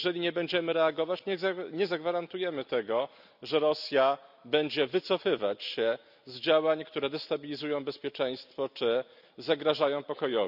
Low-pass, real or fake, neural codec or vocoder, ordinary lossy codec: 5.4 kHz; real; none; none